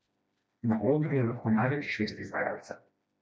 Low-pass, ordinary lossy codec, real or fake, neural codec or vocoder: none; none; fake; codec, 16 kHz, 1 kbps, FreqCodec, smaller model